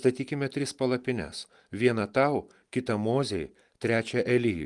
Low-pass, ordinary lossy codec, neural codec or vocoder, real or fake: 10.8 kHz; Opus, 32 kbps; none; real